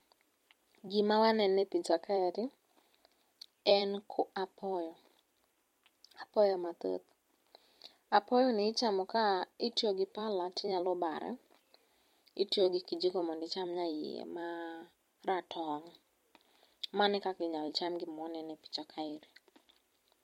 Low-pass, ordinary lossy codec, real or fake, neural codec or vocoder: 19.8 kHz; MP3, 64 kbps; fake; vocoder, 44.1 kHz, 128 mel bands every 256 samples, BigVGAN v2